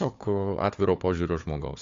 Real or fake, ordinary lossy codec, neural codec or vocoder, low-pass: fake; AAC, 48 kbps; codec, 16 kHz, 4 kbps, FunCodec, trained on Chinese and English, 50 frames a second; 7.2 kHz